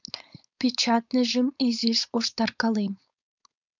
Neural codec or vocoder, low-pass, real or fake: codec, 16 kHz, 4.8 kbps, FACodec; 7.2 kHz; fake